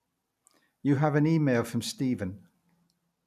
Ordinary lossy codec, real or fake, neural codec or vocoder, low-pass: none; real; none; 14.4 kHz